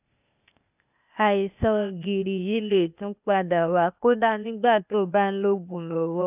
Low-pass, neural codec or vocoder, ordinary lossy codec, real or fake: 3.6 kHz; codec, 16 kHz, 0.8 kbps, ZipCodec; none; fake